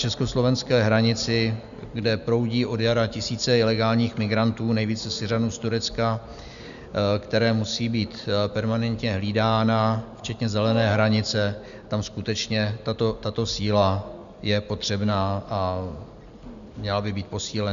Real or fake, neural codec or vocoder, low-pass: real; none; 7.2 kHz